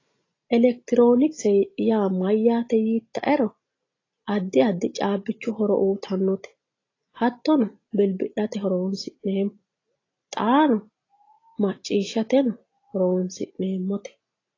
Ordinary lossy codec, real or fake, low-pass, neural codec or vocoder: AAC, 32 kbps; real; 7.2 kHz; none